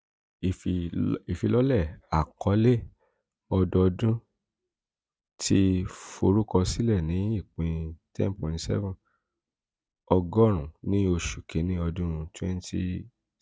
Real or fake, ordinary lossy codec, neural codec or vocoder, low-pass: real; none; none; none